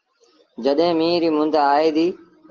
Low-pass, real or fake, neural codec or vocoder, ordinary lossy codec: 7.2 kHz; real; none; Opus, 16 kbps